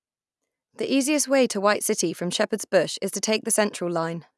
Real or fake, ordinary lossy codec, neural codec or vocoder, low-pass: real; none; none; none